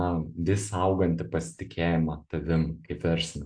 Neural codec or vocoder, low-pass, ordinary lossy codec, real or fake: none; 9.9 kHz; AAC, 64 kbps; real